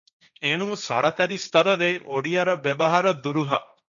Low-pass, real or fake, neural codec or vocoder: 7.2 kHz; fake; codec, 16 kHz, 1.1 kbps, Voila-Tokenizer